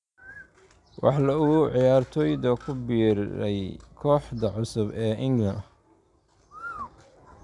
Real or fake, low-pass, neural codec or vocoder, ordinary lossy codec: real; 10.8 kHz; none; none